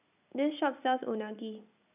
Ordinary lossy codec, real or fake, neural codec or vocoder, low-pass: none; real; none; 3.6 kHz